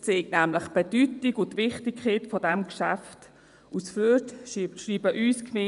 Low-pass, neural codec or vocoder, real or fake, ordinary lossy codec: 10.8 kHz; vocoder, 24 kHz, 100 mel bands, Vocos; fake; none